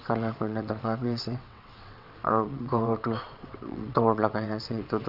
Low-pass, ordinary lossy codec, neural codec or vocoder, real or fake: 5.4 kHz; none; vocoder, 22.05 kHz, 80 mel bands, WaveNeXt; fake